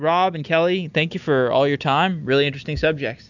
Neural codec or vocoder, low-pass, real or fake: none; 7.2 kHz; real